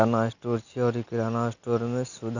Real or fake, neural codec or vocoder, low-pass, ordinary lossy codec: real; none; 7.2 kHz; none